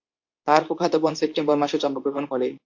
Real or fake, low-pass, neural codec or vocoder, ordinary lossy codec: fake; 7.2 kHz; codec, 24 kHz, 0.9 kbps, WavTokenizer, medium speech release version 2; AAC, 48 kbps